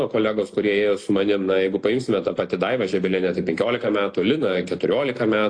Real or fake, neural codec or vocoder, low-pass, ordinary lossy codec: real; none; 9.9 kHz; AAC, 48 kbps